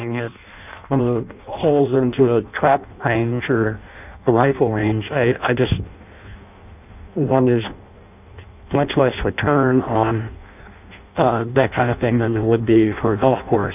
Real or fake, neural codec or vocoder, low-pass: fake; codec, 16 kHz in and 24 kHz out, 0.6 kbps, FireRedTTS-2 codec; 3.6 kHz